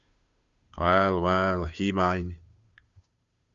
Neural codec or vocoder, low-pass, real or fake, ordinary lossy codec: codec, 16 kHz, 2 kbps, FunCodec, trained on Chinese and English, 25 frames a second; 7.2 kHz; fake; Opus, 64 kbps